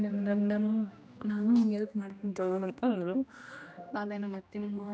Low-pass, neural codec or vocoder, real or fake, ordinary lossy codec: none; codec, 16 kHz, 1 kbps, X-Codec, HuBERT features, trained on general audio; fake; none